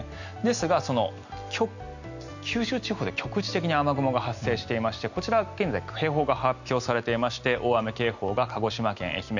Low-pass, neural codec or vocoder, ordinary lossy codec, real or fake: 7.2 kHz; none; none; real